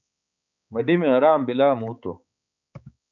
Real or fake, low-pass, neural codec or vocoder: fake; 7.2 kHz; codec, 16 kHz, 4 kbps, X-Codec, HuBERT features, trained on balanced general audio